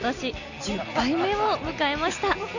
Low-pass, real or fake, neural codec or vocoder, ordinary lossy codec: 7.2 kHz; real; none; none